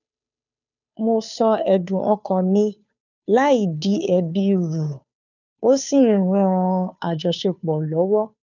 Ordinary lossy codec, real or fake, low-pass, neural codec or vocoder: none; fake; 7.2 kHz; codec, 16 kHz, 2 kbps, FunCodec, trained on Chinese and English, 25 frames a second